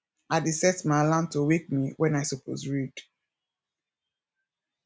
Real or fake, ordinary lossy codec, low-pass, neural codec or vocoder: real; none; none; none